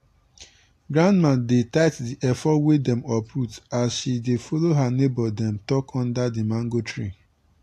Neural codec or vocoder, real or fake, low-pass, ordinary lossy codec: none; real; 14.4 kHz; AAC, 64 kbps